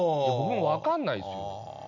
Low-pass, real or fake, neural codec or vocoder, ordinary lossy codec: 7.2 kHz; real; none; none